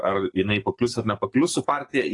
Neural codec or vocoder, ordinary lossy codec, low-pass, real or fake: codec, 44.1 kHz, 7.8 kbps, DAC; AAC, 32 kbps; 10.8 kHz; fake